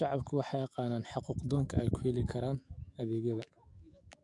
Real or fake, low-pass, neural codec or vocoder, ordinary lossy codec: fake; 10.8 kHz; autoencoder, 48 kHz, 128 numbers a frame, DAC-VAE, trained on Japanese speech; MP3, 64 kbps